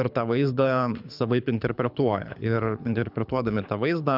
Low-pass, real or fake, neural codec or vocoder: 5.4 kHz; fake; codec, 24 kHz, 6 kbps, HILCodec